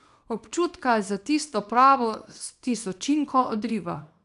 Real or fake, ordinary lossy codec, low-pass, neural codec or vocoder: fake; none; 10.8 kHz; codec, 24 kHz, 0.9 kbps, WavTokenizer, small release